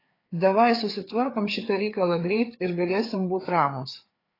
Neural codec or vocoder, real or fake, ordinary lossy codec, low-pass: codec, 16 kHz, 4 kbps, FreqCodec, larger model; fake; AAC, 24 kbps; 5.4 kHz